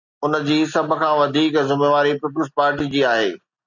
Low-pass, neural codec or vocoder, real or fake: 7.2 kHz; none; real